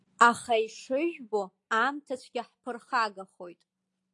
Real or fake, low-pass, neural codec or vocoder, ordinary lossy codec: real; 10.8 kHz; none; AAC, 48 kbps